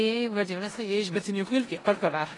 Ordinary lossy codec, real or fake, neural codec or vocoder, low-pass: AAC, 32 kbps; fake; codec, 16 kHz in and 24 kHz out, 0.4 kbps, LongCat-Audio-Codec, four codebook decoder; 10.8 kHz